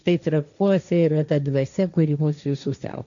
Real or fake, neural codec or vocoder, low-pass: fake; codec, 16 kHz, 1.1 kbps, Voila-Tokenizer; 7.2 kHz